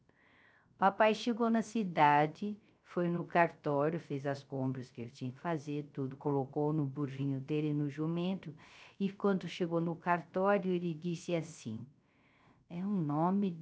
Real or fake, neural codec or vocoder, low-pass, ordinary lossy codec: fake; codec, 16 kHz, 0.3 kbps, FocalCodec; none; none